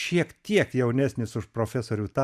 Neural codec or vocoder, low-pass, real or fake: vocoder, 44.1 kHz, 128 mel bands every 512 samples, BigVGAN v2; 14.4 kHz; fake